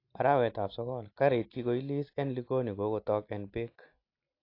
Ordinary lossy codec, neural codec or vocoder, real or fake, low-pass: AAC, 32 kbps; none; real; 5.4 kHz